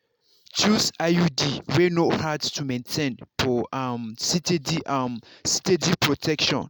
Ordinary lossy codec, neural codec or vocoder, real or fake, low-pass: none; none; real; none